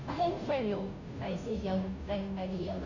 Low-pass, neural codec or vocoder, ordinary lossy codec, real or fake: 7.2 kHz; codec, 16 kHz, 0.5 kbps, FunCodec, trained on Chinese and English, 25 frames a second; none; fake